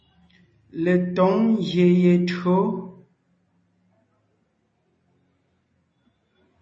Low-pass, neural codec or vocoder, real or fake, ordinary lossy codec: 9.9 kHz; none; real; MP3, 32 kbps